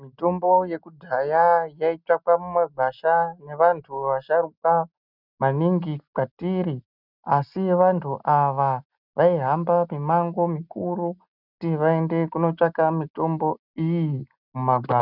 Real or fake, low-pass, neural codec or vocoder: real; 5.4 kHz; none